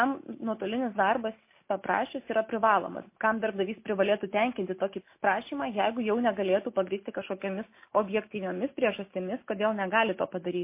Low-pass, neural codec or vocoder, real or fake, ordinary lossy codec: 3.6 kHz; none; real; MP3, 24 kbps